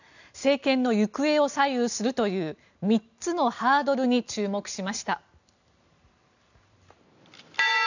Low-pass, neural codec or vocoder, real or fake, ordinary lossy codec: 7.2 kHz; none; real; none